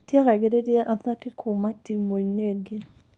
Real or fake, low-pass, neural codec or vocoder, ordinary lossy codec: fake; 10.8 kHz; codec, 24 kHz, 0.9 kbps, WavTokenizer, small release; Opus, 64 kbps